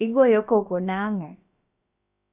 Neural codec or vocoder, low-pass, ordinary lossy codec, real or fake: codec, 16 kHz, about 1 kbps, DyCAST, with the encoder's durations; 3.6 kHz; Opus, 64 kbps; fake